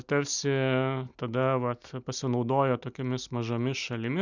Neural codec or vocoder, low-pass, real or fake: none; 7.2 kHz; real